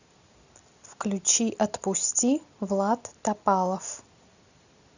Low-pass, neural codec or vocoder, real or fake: 7.2 kHz; none; real